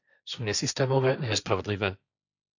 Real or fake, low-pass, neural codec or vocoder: fake; 7.2 kHz; codec, 16 kHz, 1.1 kbps, Voila-Tokenizer